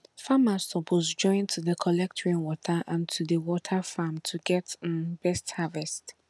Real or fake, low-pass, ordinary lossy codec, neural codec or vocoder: real; none; none; none